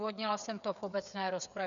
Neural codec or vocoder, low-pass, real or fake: codec, 16 kHz, 8 kbps, FreqCodec, smaller model; 7.2 kHz; fake